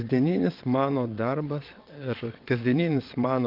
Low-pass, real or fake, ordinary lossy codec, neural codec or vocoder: 5.4 kHz; fake; Opus, 24 kbps; vocoder, 22.05 kHz, 80 mel bands, WaveNeXt